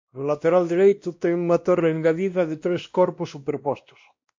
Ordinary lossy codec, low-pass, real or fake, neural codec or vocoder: MP3, 48 kbps; 7.2 kHz; fake; codec, 16 kHz, 1 kbps, X-Codec, WavLM features, trained on Multilingual LibriSpeech